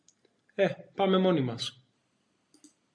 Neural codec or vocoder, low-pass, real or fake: none; 9.9 kHz; real